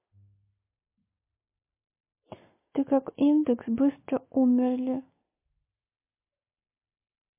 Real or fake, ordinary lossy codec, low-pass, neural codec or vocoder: real; MP3, 24 kbps; 3.6 kHz; none